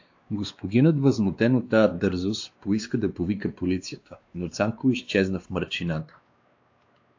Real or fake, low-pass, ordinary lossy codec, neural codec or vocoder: fake; 7.2 kHz; AAC, 48 kbps; codec, 16 kHz, 2 kbps, X-Codec, WavLM features, trained on Multilingual LibriSpeech